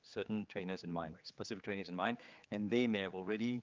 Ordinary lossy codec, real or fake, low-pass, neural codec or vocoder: Opus, 32 kbps; fake; 7.2 kHz; codec, 16 kHz, 4 kbps, X-Codec, HuBERT features, trained on general audio